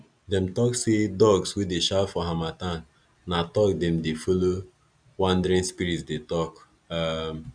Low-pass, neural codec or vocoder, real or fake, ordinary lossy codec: 9.9 kHz; none; real; none